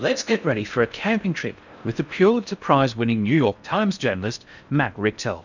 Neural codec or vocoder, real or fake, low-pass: codec, 16 kHz in and 24 kHz out, 0.6 kbps, FocalCodec, streaming, 4096 codes; fake; 7.2 kHz